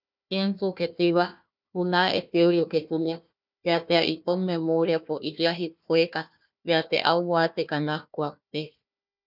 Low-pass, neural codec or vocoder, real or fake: 5.4 kHz; codec, 16 kHz, 1 kbps, FunCodec, trained on Chinese and English, 50 frames a second; fake